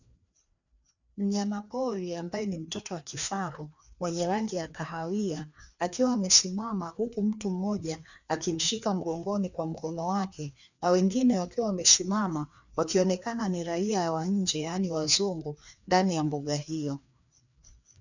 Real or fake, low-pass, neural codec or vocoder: fake; 7.2 kHz; codec, 16 kHz, 2 kbps, FreqCodec, larger model